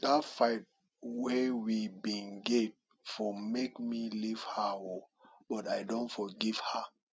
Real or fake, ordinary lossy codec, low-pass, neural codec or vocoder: real; none; none; none